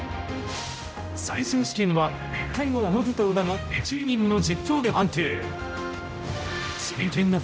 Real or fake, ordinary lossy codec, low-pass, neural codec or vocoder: fake; none; none; codec, 16 kHz, 0.5 kbps, X-Codec, HuBERT features, trained on general audio